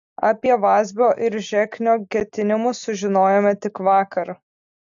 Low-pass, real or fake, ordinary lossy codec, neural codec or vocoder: 7.2 kHz; real; AAC, 64 kbps; none